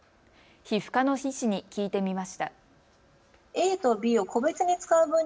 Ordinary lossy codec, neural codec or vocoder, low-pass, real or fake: none; none; none; real